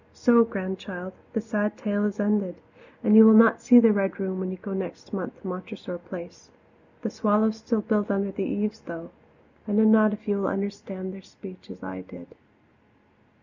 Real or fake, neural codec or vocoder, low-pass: real; none; 7.2 kHz